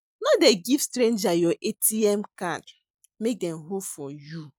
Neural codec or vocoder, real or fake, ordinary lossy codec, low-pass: none; real; none; none